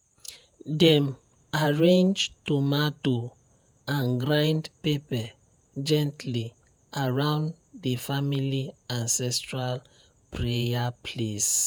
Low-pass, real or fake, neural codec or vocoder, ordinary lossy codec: none; fake; vocoder, 48 kHz, 128 mel bands, Vocos; none